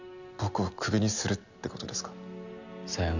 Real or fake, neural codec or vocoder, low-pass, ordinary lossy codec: real; none; 7.2 kHz; none